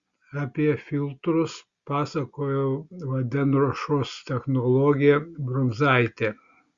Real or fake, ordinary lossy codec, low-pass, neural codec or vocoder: real; Opus, 64 kbps; 7.2 kHz; none